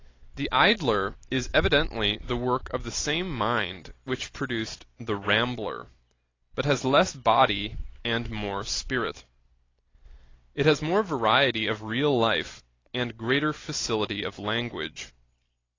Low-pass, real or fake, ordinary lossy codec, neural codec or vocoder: 7.2 kHz; real; AAC, 32 kbps; none